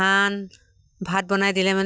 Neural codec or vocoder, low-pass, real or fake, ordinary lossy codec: none; none; real; none